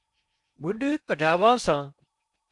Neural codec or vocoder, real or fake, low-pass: codec, 16 kHz in and 24 kHz out, 0.8 kbps, FocalCodec, streaming, 65536 codes; fake; 10.8 kHz